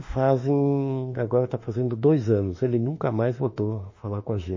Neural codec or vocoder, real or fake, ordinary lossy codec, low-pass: autoencoder, 48 kHz, 32 numbers a frame, DAC-VAE, trained on Japanese speech; fake; MP3, 32 kbps; 7.2 kHz